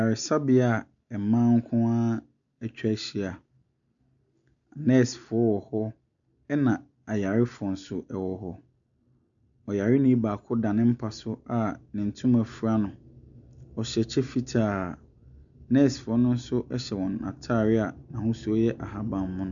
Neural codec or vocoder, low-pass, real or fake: none; 7.2 kHz; real